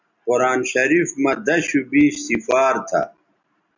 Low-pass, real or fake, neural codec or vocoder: 7.2 kHz; real; none